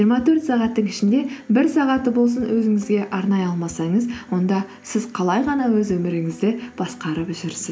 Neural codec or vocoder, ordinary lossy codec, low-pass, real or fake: none; none; none; real